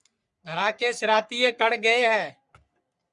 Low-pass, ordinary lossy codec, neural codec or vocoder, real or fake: 10.8 kHz; Opus, 64 kbps; codec, 44.1 kHz, 3.4 kbps, Pupu-Codec; fake